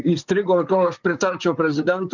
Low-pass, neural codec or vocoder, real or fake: 7.2 kHz; vocoder, 44.1 kHz, 128 mel bands, Pupu-Vocoder; fake